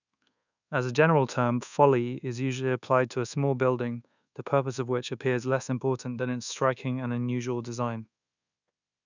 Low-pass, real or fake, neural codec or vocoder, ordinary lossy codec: 7.2 kHz; fake; codec, 24 kHz, 1.2 kbps, DualCodec; none